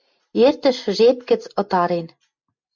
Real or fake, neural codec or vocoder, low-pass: real; none; 7.2 kHz